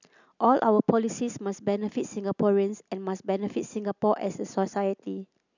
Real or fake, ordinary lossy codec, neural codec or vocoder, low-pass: real; none; none; 7.2 kHz